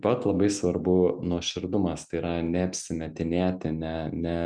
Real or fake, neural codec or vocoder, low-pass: real; none; 9.9 kHz